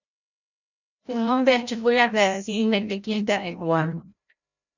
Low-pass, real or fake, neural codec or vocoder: 7.2 kHz; fake; codec, 16 kHz, 0.5 kbps, FreqCodec, larger model